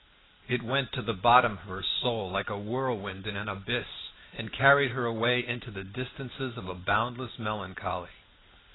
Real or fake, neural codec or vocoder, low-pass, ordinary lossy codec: real; none; 7.2 kHz; AAC, 16 kbps